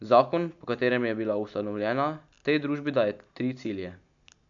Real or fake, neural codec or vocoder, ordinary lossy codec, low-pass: real; none; none; 7.2 kHz